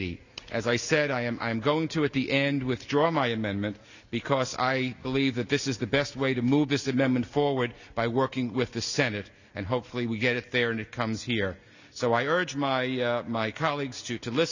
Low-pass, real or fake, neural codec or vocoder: 7.2 kHz; real; none